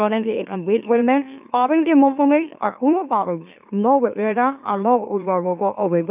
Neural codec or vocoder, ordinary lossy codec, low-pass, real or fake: autoencoder, 44.1 kHz, a latent of 192 numbers a frame, MeloTTS; none; 3.6 kHz; fake